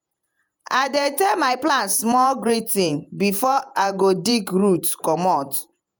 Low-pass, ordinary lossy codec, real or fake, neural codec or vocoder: none; none; fake; vocoder, 48 kHz, 128 mel bands, Vocos